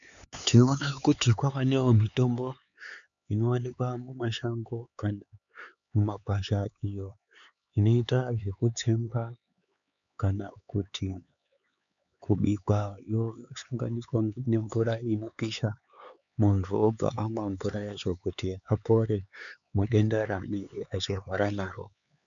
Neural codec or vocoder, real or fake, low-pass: codec, 16 kHz, 4 kbps, X-Codec, HuBERT features, trained on LibriSpeech; fake; 7.2 kHz